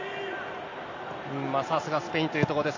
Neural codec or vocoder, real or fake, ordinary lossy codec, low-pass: none; real; AAC, 48 kbps; 7.2 kHz